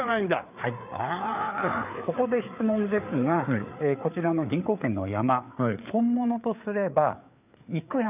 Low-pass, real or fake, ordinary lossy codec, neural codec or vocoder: 3.6 kHz; fake; none; codec, 16 kHz, 4 kbps, FreqCodec, larger model